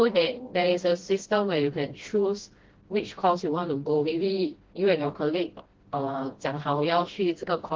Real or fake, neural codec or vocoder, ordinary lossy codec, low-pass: fake; codec, 16 kHz, 1 kbps, FreqCodec, smaller model; Opus, 16 kbps; 7.2 kHz